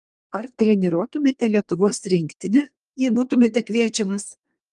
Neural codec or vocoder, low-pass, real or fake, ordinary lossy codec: codec, 24 kHz, 1 kbps, SNAC; 10.8 kHz; fake; Opus, 32 kbps